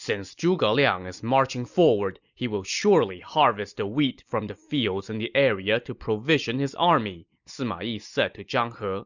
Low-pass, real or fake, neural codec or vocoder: 7.2 kHz; real; none